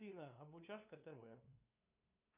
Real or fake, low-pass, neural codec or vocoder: fake; 3.6 kHz; codec, 16 kHz, 2 kbps, FunCodec, trained on LibriTTS, 25 frames a second